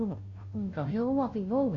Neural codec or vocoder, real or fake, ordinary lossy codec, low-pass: codec, 16 kHz, 0.5 kbps, FunCodec, trained on Chinese and English, 25 frames a second; fake; AAC, 64 kbps; 7.2 kHz